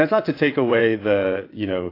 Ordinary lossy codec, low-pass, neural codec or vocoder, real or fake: AAC, 32 kbps; 5.4 kHz; vocoder, 22.05 kHz, 80 mel bands, WaveNeXt; fake